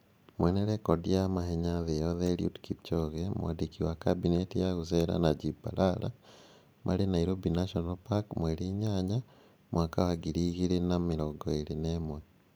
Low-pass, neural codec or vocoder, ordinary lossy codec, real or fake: none; vocoder, 44.1 kHz, 128 mel bands every 512 samples, BigVGAN v2; none; fake